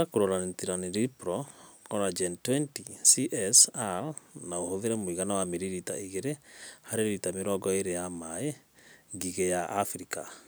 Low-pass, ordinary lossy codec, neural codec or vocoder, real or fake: none; none; none; real